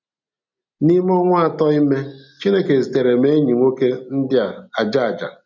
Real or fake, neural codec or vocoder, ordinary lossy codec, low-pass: real; none; none; 7.2 kHz